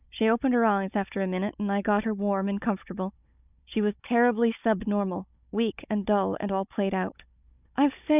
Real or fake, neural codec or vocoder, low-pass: fake; codec, 16 kHz, 16 kbps, FunCodec, trained on Chinese and English, 50 frames a second; 3.6 kHz